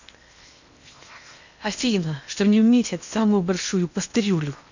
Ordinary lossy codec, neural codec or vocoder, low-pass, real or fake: none; codec, 16 kHz in and 24 kHz out, 0.8 kbps, FocalCodec, streaming, 65536 codes; 7.2 kHz; fake